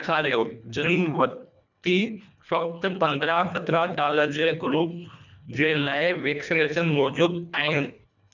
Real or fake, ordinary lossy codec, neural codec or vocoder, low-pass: fake; none; codec, 24 kHz, 1.5 kbps, HILCodec; 7.2 kHz